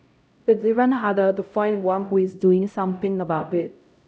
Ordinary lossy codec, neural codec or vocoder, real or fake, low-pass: none; codec, 16 kHz, 0.5 kbps, X-Codec, HuBERT features, trained on LibriSpeech; fake; none